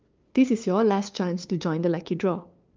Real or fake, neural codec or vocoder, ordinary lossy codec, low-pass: fake; codec, 16 kHz, 2 kbps, FunCodec, trained on LibriTTS, 25 frames a second; Opus, 24 kbps; 7.2 kHz